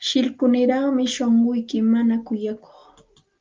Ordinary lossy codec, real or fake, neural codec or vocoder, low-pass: Opus, 24 kbps; real; none; 7.2 kHz